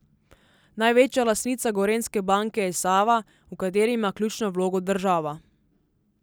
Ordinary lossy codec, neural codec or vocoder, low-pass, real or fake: none; none; none; real